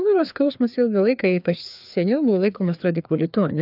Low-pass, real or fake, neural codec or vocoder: 5.4 kHz; fake; codec, 16 kHz, 2 kbps, FreqCodec, larger model